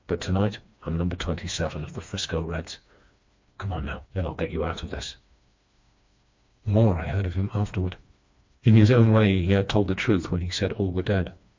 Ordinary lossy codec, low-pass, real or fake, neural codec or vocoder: MP3, 48 kbps; 7.2 kHz; fake; codec, 16 kHz, 2 kbps, FreqCodec, smaller model